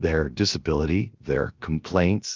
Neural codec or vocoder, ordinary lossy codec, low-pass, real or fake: codec, 16 kHz, 0.7 kbps, FocalCodec; Opus, 32 kbps; 7.2 kHz; fake